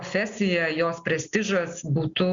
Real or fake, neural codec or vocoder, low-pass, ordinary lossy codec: real; none; 7.2 kHz; Opus, 64 kbps